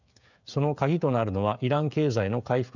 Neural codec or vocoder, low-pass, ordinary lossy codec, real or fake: codec, 16 kHz, 16 kbps, FreqCodec, smaller model; 7.2 kHz; none; fake